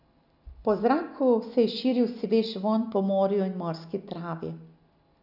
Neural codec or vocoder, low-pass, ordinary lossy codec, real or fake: none; 5.4 kHz; none; real